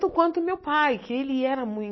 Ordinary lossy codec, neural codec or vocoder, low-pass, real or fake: MP3, 24 kbps; none; 7.2 kHz; real